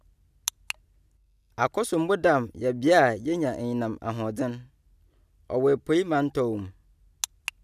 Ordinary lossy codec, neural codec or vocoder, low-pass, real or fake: none; none; 14.4 kHz; real